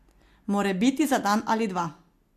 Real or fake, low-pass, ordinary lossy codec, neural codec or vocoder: real; 14.4 kHz; AAC, 64 kbps; none